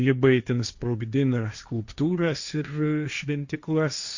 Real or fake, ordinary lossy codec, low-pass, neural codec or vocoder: fake; Opus, 64 kbps; 7.2 kHz; codec, 16 kHz, 1.1 kbps, Voila-Tokenizer